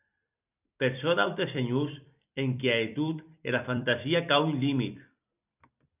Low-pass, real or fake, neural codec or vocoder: 3.6 kHz; fake; vocoder, 44.1 kHz, 128 mel bands every 256 samples, BigVGAN v2